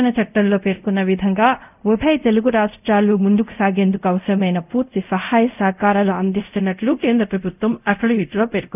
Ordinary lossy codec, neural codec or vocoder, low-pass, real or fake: none; codec, 24 kHz, 0.5 kbps, DualCodec; 3.6 kHz; fake